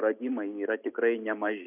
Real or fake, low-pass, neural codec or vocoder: real; 3.6 kHz; none